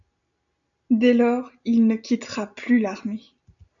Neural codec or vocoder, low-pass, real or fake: none; 7.2 kHz; real